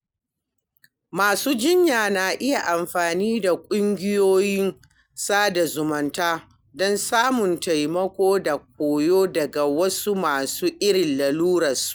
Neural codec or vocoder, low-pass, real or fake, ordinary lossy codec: none; none; real; none